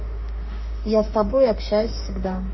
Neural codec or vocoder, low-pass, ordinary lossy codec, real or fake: autoencoder, 48 kHz, 32 numbers a frame, DAC-VAE, trained on Japanese speech; 7.2 kHz; MP3, 24 kbps; fake